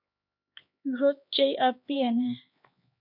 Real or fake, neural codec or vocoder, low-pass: fake; codec, 16 kHz, 2 kbps, X-Codec, HuBERT features, trained on LibriSpeech; 5.4 kHz